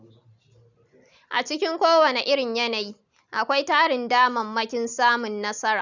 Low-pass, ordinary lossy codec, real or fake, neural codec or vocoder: 7.2 kHz; none; real; none